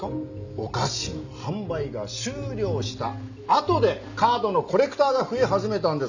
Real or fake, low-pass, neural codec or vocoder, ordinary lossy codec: real; 7.2 kHz; none; none